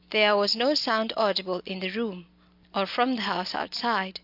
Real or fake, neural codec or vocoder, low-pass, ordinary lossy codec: real; none; 5.4 kHz; AAC, 48 kbps